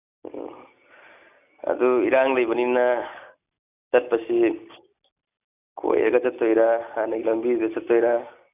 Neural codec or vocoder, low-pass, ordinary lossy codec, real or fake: none; 3.6 kHz; none; real